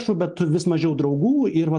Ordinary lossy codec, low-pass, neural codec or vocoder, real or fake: Opus, 24 kbps; 10.8 kHz; none; real